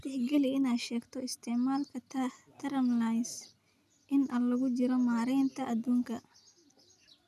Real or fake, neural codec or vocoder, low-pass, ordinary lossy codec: real; none; 14.4 kHz; none